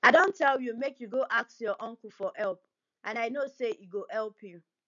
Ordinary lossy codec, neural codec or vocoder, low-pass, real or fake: none; none; 7.2 kHz; real